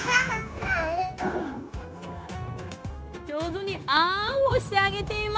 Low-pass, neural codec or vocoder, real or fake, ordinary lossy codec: none; codec, 16 kHz, 0.9 kbps, LongCat-Audio-Codec; fake; none